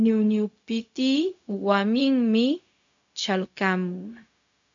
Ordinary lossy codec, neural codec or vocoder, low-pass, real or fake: MP3, 64 kbps; codec, 16 kHz, 0.4 kbps, LongCat-Audio-Codec; 7.2 kHz; fake